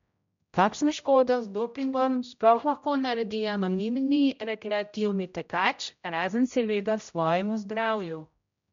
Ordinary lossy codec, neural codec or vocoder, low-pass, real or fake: MP3, 64 kbps; codec, 16 kHz, 0.5 kbps, X-Codec, HuBERT features, trained on general audio; 7.2 kHz; fake